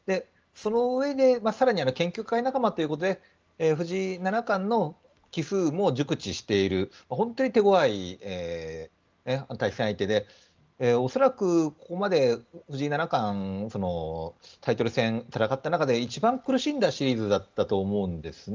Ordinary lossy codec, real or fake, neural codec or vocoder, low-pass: Opus, 24 kbps; real; none; 7.2 kHz